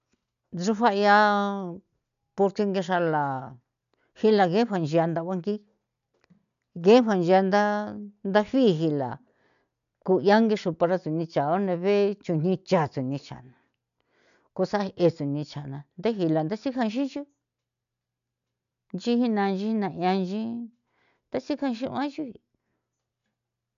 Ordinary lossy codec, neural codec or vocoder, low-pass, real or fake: none; none; 7.2 kHz; real